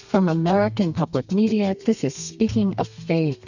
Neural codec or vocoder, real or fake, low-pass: codec, 32 kHz, 1.9 kbps, SNAC; fake; 7.2 kHz